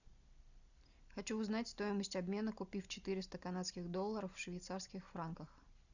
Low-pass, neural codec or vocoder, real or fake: 7.2 kHz; none; real